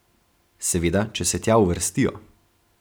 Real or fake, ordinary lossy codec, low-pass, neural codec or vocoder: real; none; none; none